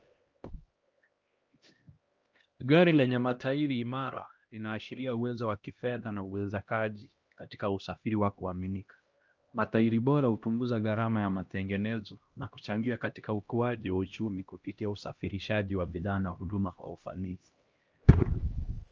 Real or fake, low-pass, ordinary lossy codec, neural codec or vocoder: fake; 7.2 kHz; Opus, 24 kbps; codec, 16 kHz, 1 kbps, X-Codec, HuBERT features, trained on LibriSpeech